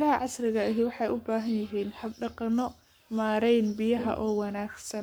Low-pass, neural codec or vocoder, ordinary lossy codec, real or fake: none; codec, 44.1 kHz, 7.8 kbps, Pupu-Codec; none; fake